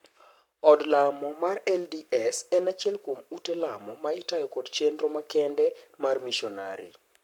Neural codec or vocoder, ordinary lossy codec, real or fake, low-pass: codec, 44.1 kHz, 7.8 kbps, Pupu-Codec; none; fake; 19.8 kHz